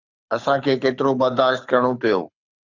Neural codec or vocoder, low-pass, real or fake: codec, 24 kHz, 6 kbps, HILCodec; 7.2 kHz; fake